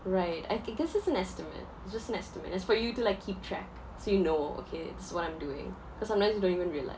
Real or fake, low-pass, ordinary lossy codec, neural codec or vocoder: real; none; none; none